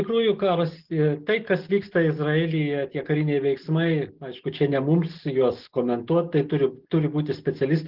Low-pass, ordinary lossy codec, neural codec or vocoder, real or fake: 5.4 kHz; Opus, 16 kbps; none; real